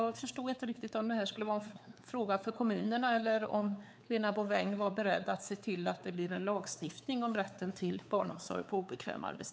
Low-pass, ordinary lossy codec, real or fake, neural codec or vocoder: none; none; fake; codec, 16 kHz, 4 kbps, X-Codec, WavLM features, trained on Multilingual LibriSpeech